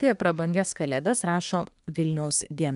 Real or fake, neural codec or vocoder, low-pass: fake; codec, 24 kHz, 1 kbps, SNAC; 10.8 kHz